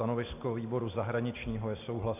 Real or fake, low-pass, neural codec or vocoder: real; 3.6 kHz; none